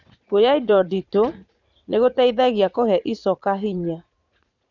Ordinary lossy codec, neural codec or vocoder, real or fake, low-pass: none; vocoder, 44.1 kHz, 80 mel bands, Vocos; fake; 7.2 kHz